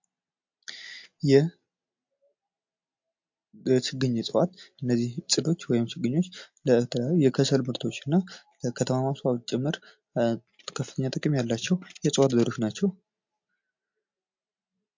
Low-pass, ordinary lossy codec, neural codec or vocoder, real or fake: 7.2 kHz; MP3, 48 kbps; none; real